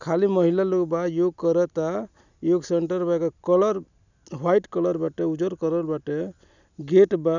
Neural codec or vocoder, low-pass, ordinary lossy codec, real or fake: none; 7.2 kHz; none; real